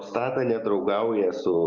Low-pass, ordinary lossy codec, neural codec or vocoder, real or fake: 7.2 kHz; Opus, 64 kbps; none; real